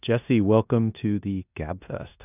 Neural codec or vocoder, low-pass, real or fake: codec, 16 kHz in and 24 kHz out, 0.9 kbps, LongCat-Audio-Codec, four codebook decoder; 3.6 kHz; fake